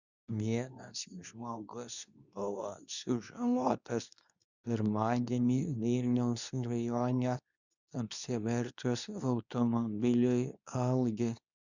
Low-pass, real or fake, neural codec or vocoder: 7.2 kHz; fake; codec, 24 kHz, 0.9 kbps, WavTokenizer, medium speech release version 2